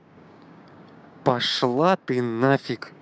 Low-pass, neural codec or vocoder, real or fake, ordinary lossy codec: none; codec, 16 kHz, 6 kbps, DAC; fake; none